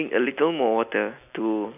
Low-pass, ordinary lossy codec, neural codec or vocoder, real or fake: 3.6 kHz; none; none; real